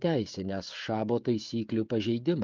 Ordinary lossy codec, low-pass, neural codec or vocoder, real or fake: Opus, 24 kbps; 7.2 kHz; codec, 16 kHz, 16 kbps, FreqCodec, smaller model; fake